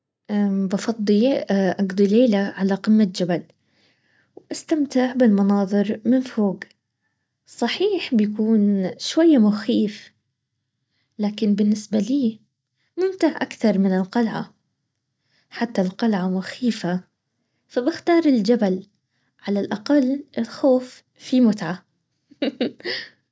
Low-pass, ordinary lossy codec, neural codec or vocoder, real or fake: none; none; none; real